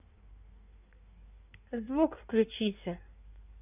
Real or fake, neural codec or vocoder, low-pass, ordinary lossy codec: fake; codec, 16 kHz in and 24 kHz out, 1.1 kbps, FireRedTTS-2 codec; 3.6 kHz; none